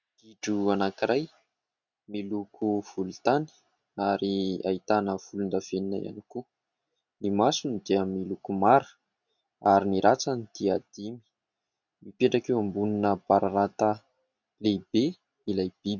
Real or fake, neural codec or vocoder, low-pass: real; none; 7.2 kHz